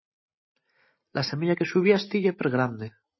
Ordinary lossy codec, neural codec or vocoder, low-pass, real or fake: MP3, 24 kbps; none; 7.2 kHz; real